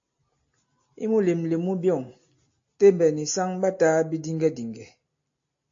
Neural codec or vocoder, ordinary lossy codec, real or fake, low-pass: none; AAC, 48 kbps; real; 7.2 kHz